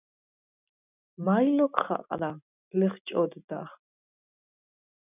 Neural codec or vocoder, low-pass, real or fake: none; 3.6 kHz; real